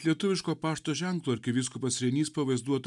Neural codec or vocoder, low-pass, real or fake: none; 10.8 kHz; real